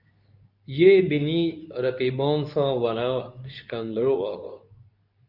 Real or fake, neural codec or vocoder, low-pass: fake; codec, 24 kHz, 0.9 kbps, WavTokenizer, medium speech release version 2; 5.4 kHz